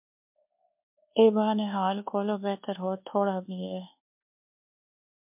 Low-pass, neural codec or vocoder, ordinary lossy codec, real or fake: 3.6 kHz; codec, 24 kHz, 1.2 kbps, DualCodec; MP3, 32 kbps; fake